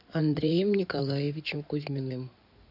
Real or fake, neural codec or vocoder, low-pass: fake; codec, 16 kHz in and 24 kHz out, 2.2 kbps, FireRedTTS-2 codec; 5.4 kHz